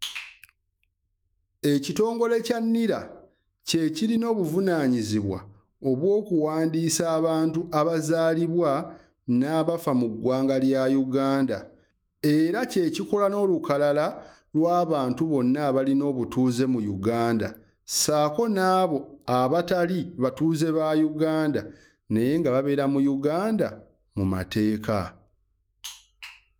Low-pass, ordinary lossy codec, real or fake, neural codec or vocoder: none; none; fake; autoencoder, 48 kHz, 128 numbers a frame, DAC-VAE, trained on Japanese speech